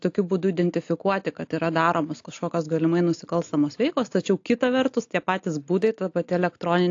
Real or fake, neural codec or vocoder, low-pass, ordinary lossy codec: real; none; 7.2 kHz; AAC, 48 kbps